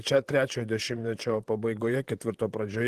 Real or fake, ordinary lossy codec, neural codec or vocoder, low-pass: fake; Opus, 16 kbps; vocoder, 44.1 kHz, 128 mel bands, Pupu-Vocoder; 14.4 kHz